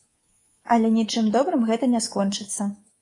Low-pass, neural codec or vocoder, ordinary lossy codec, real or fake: 10.8 kHz; codec, 24 kHz, 3.1 kbps, DualCodec; AAC, 32 kbps; fake